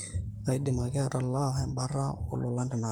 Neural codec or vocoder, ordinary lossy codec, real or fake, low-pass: codec, 44.1 kHz, 7.8 kbps, Pupu-Codec; none; fake; none